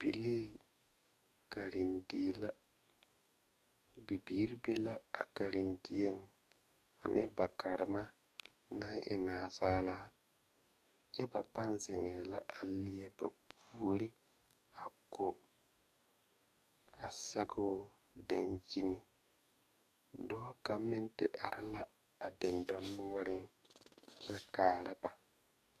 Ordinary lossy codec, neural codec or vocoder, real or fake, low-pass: AAC, 96 kbps; codec, 44.1 kHz, 2.6 kbps, DAC; fake; 14.4 kHz